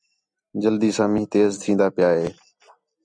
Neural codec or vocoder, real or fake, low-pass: none; real; 9.9 kHz